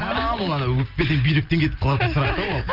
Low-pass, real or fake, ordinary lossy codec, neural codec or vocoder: 5.4 kHz; real; Opus, 24 kbps; none